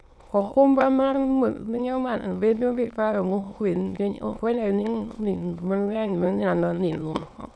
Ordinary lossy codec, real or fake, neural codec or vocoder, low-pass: none; fake; autoencoder, 22.05 kHz, a latent of 192 numbers a frame, VITS, trained on many speakers; none